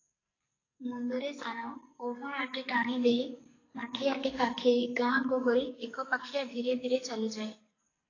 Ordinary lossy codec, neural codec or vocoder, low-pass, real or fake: AAC, 32 kbps; codec, 44.1 kHz, 2.6 kbps, SNAC; 7.2 kHz; fake